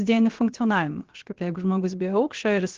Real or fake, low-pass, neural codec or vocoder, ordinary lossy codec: fake; 7.2 kHz; codec, 16 kHz, about 1 kbps, DyCAST, with the encoder's durations; Opus, 16 kbps